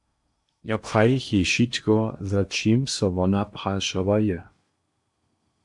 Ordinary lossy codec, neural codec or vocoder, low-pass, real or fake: MP3, 64 kbps; codec, 16 kHz in and 24 kHz out, 0.8 kbps, FocalCodec, streaming, 65536 codes; 10.8 kHz; fake